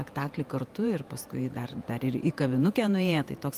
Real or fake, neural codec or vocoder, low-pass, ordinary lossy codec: fake; vocoder, 48 kHz, 128 mel bands, Vocos; 14.4 kHz; Opus, 32 kbps